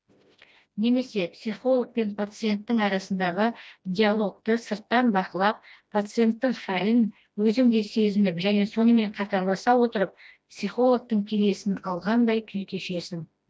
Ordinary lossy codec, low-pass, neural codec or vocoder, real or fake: none; none; codec, 16 kHz, 1 kbps, FreqCodec, smaller model; fake